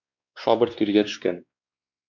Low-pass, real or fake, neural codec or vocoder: 7.2 kHz; fake; codec, 16 kHz, 2 kbps, X-Codec, WavLM features, trained on Multilingual LibriSpeech